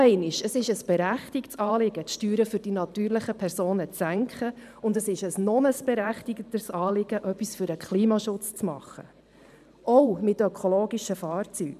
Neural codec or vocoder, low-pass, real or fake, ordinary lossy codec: vocoder, 44.1 kHz, 128 mel bands every 512 samples, BigVGAN v2; 14.4 kHz; fake; none